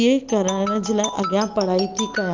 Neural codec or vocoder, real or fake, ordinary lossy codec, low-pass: none; real; Opus, 32 kbps; 7.2 kHz